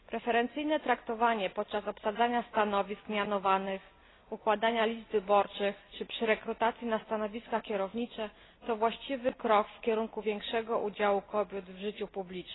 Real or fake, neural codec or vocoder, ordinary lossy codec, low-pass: real; none; AAC, 16 kbps; 7.2 kHz